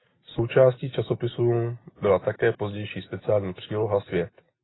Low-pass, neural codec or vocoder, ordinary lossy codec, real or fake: 7.2 kHz; none; AAC, 16 kbps; real